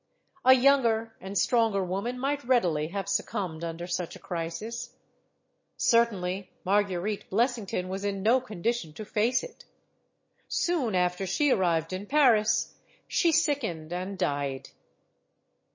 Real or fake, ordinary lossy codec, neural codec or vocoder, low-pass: real; MP3, 32 kbps; none; 7.2 kHz